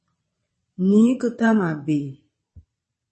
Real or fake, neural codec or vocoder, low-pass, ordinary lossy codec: fake; vocoder, 22.05 kHz, 80 mel bands, WaveNeXt; 9.9 kHz; MP3, 32 kbps